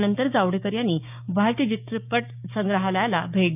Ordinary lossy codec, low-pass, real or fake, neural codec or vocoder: none; 3.6 kHz; fake; codec, 16 kHz, 6 kbps, DAC